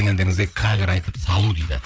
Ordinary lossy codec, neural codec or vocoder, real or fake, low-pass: none; codec, 16 kHz, 8 kbps, FreqCodec, larger model; fake; none